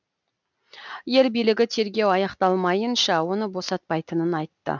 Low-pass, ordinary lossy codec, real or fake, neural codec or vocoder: 7.2 kHz; none; real; none